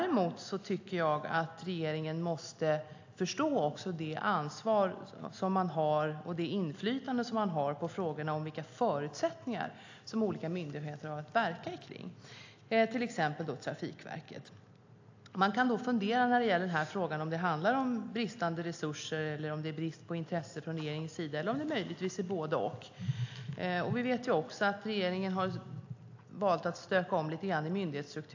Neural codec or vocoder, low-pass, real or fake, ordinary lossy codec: none; 7.2 kHz; real; AAC, 48 kbps